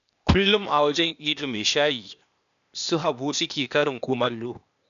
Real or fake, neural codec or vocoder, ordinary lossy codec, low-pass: fake; codec, 16 kHz, 0.8 kbps, ZipCodec; none; 7.2 kHz